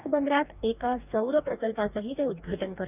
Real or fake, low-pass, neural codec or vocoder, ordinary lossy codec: fake; 3.6 kHz; codec, 44.1 kHz, 2.6 kbps, DAC; none